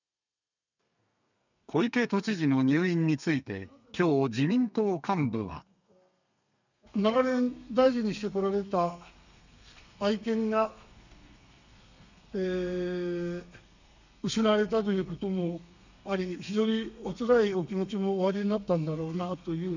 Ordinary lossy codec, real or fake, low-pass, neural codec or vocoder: none; fake; 7.2 kHz; codec, 32 kHz, 1.9 kbps, SNAC